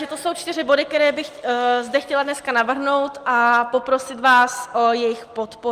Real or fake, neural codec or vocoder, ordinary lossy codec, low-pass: real; none; Opus, 32 kbps; 14.4 kHz